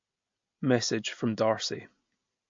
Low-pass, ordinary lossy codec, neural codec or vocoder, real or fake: 7.2 kHz; MP3, 48 kbps; none; real